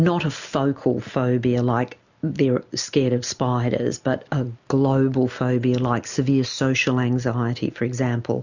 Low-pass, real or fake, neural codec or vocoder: 7.2 kHz; real; none